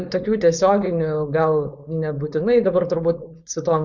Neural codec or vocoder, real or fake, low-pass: codec, 16 kHz, 4.8 kbps, FACodec; fake; 7.2 kHz